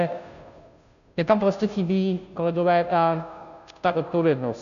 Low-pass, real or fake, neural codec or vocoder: 7.2 kHz; fake; codec, 16 kHz, 0.5 kbps, FunCodec, trained on Chinese and English, 25 frames a second